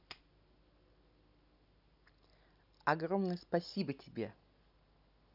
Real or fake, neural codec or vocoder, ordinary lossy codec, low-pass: real; none; none; 5.4 kHz